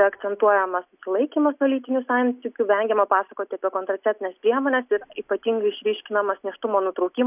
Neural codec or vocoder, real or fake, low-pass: none; real; 3.6 kHz